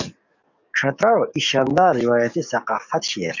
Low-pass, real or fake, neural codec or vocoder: 7.2 kHz; fake; codec, 44.1 kHz, 7.8 kbps, DAC